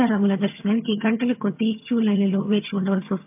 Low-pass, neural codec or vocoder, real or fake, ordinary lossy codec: 3.6 kHz; vocoder, 22.05 kHz, 80 mel bands, HiFi-GAN; fake; MP3, 32 kbps